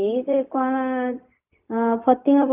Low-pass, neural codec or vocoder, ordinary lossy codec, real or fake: 3.6 kHz; codec, 16 kHz, 0.4 kbps, LongCat-Audio-Codec; none; fake